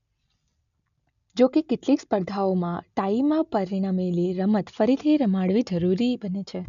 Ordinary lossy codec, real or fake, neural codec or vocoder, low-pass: none; real; none; 7.2 kHz